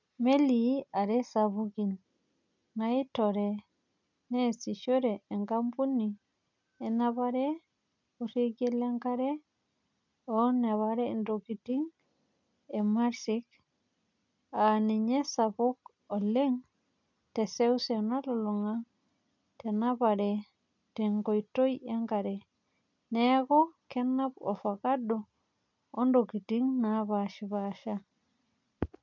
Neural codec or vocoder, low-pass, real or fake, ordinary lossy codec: none; 7.2 kHz; real; none